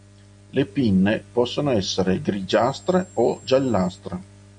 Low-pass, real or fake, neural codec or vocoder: 9.9 kHz; real; none